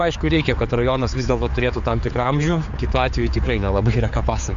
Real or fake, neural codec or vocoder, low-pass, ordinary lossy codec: fake; codec, 16 kHz, 4 kbps, X-Codec, HuBERT features, trained on general audio; 7.2 kHz; MP3, 64 kbps